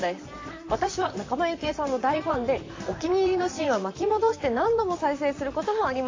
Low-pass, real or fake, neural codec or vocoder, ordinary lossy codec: 7.2 kHz; fake; vocoder, 44.1 kHz, 128 mel bands, Pupu-Vocoder; AAC, 32 kbps